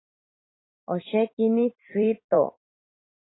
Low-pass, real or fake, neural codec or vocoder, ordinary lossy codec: 7.2 kHz; fake; vocoder, 44.1 kHz, 128 mel bands every 256 samples, BigVGAN v2; AAC, 16 kbps